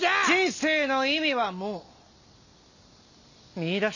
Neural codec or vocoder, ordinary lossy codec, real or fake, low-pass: none; none; real; 7.2 kHz